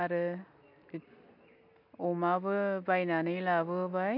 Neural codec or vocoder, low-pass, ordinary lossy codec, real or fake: none; 5.4 kHz; MP3, 48 kbps; real